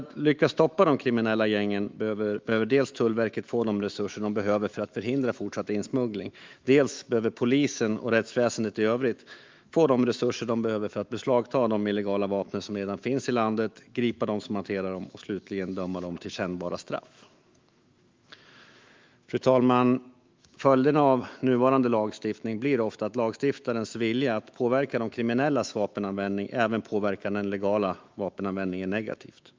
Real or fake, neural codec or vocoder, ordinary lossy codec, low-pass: fake; autoencoder, 48 kHz, 128 numbers a frame, DAC-VAE, trained on Japanese speech; Opus, 32 kbps; 7.2 kHz